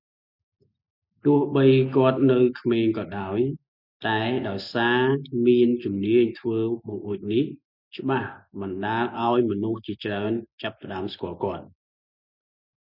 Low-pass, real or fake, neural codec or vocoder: 5.4 kHz; real; none